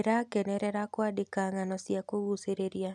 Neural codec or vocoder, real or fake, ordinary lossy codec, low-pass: none; real; none; none